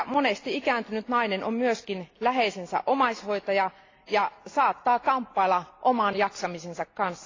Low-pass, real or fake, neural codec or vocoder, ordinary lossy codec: 7.2 kHz; real; none; AAC, 32 kbps